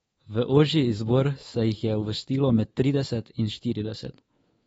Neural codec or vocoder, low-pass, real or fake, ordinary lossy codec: codec, 24 kHz, 3.1 kbps, DualCodec; 10.8 kHz; fake; AAC, 24 kbps